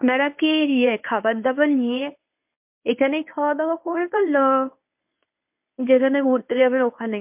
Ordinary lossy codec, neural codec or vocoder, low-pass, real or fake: MP3, 32 kbps; codec, 24 kHz, 0.9 kbps, WavTokenizer, medium speech release version 1; 3.6 kHz; fake